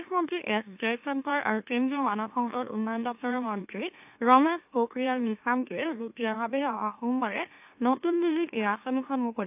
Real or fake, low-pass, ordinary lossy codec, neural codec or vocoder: fake; 3.6 kHz; AAC, 32 kbps; autoencoder, 44.1 kHz, a latent of 192 numbers a frame, MeloTTS